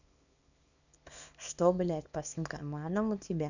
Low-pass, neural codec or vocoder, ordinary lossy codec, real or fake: 7.2 kHz; codec, 24 kHz, 0.9 kbps, WavTokenizer, small release; none; fake